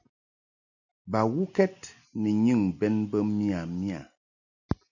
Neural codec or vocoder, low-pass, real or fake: none; 7.2 kHz; real